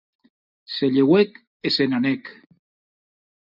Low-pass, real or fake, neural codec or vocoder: 5.4 kHz; real; none